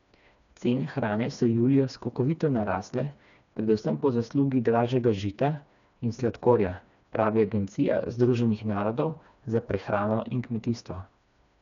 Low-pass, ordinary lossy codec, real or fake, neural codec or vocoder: 7.2 kHz; none; fake; codec, 16 kHz, 2 kbps, FreqCodec, smaller model